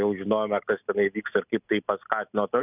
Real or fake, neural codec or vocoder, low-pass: real; none; 3.6 kHz